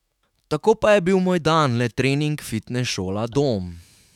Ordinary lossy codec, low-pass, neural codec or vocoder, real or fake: none; 19.8 kHz; autoencoder, 48 kHz, 128 numbers a frame, DAC-VAE, trained on Japanese speech; fake